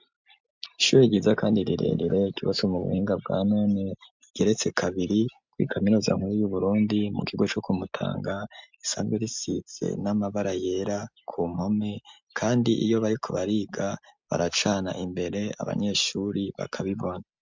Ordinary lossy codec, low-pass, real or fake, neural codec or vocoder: MP3, 64 kbps; 7.2 kHz; real; none